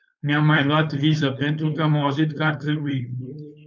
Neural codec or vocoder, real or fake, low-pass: codec, 16 kHz, 4.8 kbps, FACodec; fake; 7.2 kHz